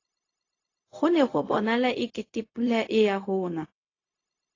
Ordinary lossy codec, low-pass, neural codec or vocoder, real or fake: AAC, 32 kbps; 7.2 kHz; codec, 16 kHz, 0.4 kbps, LongCat-Audio-Codec; fake